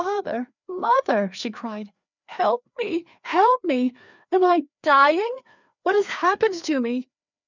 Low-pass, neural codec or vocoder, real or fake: 7.2 kHz; codec, 16 kHz, 2 kbps, FreqCodec, larger model; fake